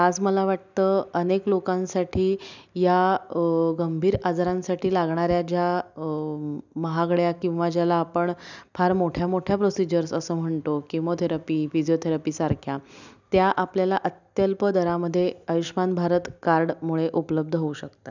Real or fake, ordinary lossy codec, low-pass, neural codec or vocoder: real; none; 7.2 kHz; none